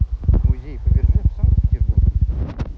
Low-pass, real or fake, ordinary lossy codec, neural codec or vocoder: none; real; none; none